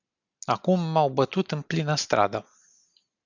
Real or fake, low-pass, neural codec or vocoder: real; 7.2 kHz; none